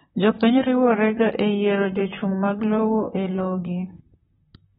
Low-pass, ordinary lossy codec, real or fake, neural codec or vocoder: 19.8 kHz; AAC, 16 kbps; fake; vocoder, 44.1 kHz, 128 mel bands, Pupu-Vocoder